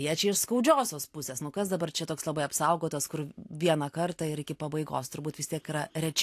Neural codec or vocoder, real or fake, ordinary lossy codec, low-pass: none; real; AAC, 64 kbps; 14.4 kHz